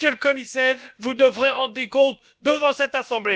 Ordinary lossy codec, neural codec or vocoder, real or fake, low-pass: none; codec, 16 kHz, about 1 kbps, DyCAST, with the encoder's durations; fake; none